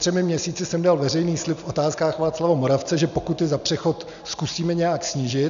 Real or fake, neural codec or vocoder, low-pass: real; none; 7.2 kHz